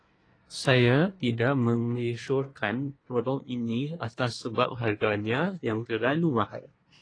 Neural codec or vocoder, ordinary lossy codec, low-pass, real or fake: codec, 24 kHz, 1 kbps, SNAC; AAC, 32 kbps; 9.9 kHz; fake